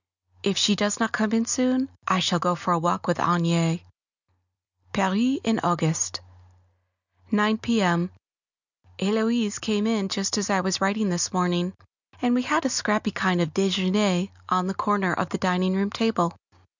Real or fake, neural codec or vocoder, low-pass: real; none; 7.2 kHz